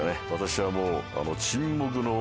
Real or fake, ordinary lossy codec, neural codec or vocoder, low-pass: real; none; none; none